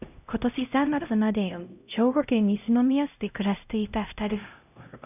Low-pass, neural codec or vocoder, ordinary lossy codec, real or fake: 3.6 kHz; codec, 16 kHz, 0.5 kbps, X-Codec, HuBERT features, trained on LibriSpeech; none; fake